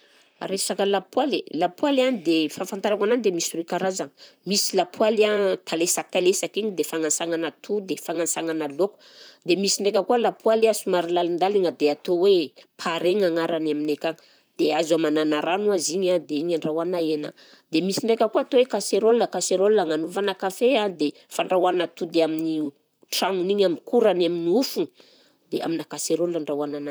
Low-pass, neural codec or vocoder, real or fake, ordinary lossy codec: none; vocoder, 44.1 kHz, 128 mel bands, Pupu-Vocoder; fake; none